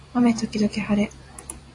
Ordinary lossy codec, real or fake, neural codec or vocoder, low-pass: AAC, 48 kbps; fake; vocoder, 44.1 kHz, 128 mel bands every 256 samples, BigVGAN v2; 10.8 kHz